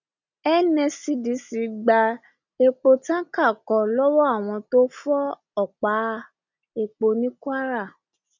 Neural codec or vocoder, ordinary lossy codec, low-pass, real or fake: none; none; 7.2 kHz; real